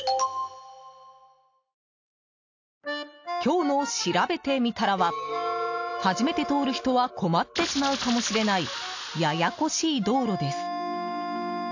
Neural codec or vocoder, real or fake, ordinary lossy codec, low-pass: none; real; AAC, 48 kbps; 7.2 kHz